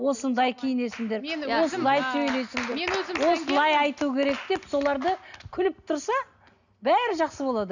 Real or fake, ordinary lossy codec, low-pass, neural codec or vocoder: real; none; 7.2 kHz; none